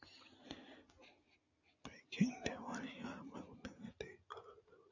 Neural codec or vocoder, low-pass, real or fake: none; 7.2 kHz; real